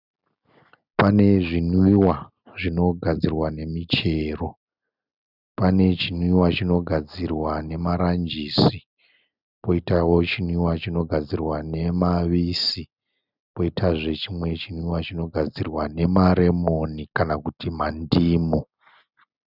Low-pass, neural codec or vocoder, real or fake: 5.4 kHz; none; real